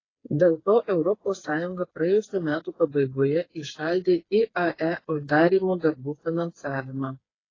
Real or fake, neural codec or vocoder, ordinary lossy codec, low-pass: fake; codec, 16 kHz, 4 kbps, FreqCodec, smaller model; AAC, 32 kbps; 7.2 kHz